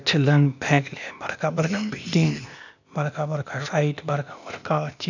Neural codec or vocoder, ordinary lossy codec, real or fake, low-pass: codec, 16 kHz, 0.8 kbps, ZipCodec; none; fake; 7.2 kHz